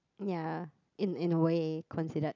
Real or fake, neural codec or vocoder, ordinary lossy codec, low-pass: real; none; none; 7.2 kHz